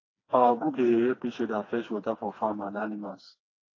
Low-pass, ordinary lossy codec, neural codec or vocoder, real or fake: 7.2 kHz; AAC, 32 kbps; codec, 16 kHz, 2 kbps, FreqCodec, smaller model; fake